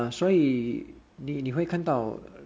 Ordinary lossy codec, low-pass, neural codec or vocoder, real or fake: none; none; none; real